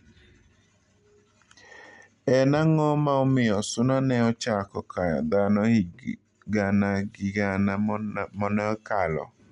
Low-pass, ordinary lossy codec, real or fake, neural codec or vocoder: 10.8 kHz; MP3, 96 kbps; real; none